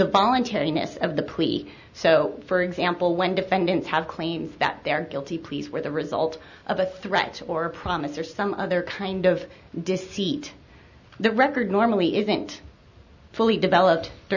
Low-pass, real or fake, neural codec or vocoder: 7.2 kHz; real; none